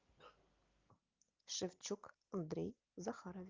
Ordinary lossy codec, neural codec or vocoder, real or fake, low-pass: Opus, 32 kbps; none; real; 7.2 kHz